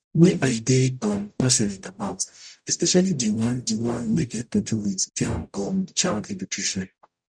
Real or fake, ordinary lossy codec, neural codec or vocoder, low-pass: fake; none; codec, 44.1 kHz, 0.9 kbps, DAC; 9.9 kHz